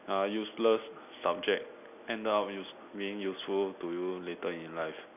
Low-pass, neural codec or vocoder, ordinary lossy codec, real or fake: 3.6 kHz; none; none; real